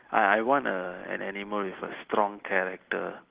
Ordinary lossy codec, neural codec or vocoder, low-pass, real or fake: Opus, 16 kbps; none; 3.6 kHz; real